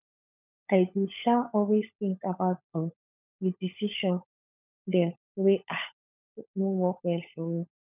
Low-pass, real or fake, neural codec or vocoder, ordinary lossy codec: 3.6 kHz; fake; codec, 16 kHz, 16 kbps, FunCodec, trained on LibriTTS, 50 frames a second; none